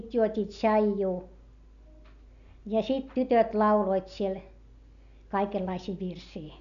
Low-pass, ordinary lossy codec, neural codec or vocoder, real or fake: 7.2 kHz; none; none; real